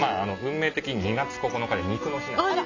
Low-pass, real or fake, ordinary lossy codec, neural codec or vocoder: 7.2 kHz; real; none; none